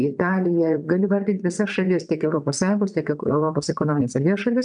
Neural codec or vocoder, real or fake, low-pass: vocoder, 22.05 kHz, 80 mel bands, WaveNeXt; fake; 9.9 kHz